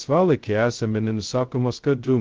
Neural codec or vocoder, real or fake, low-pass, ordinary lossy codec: codec, 16 kHz, 0.2 kbps, FocalCodec; fake; 7.2 kHz; Opus, 16 kbps